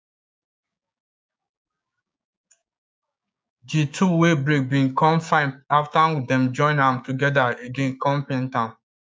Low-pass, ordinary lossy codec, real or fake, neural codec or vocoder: none; none; fake; codec, 16 kHz, 6 kbps, DAC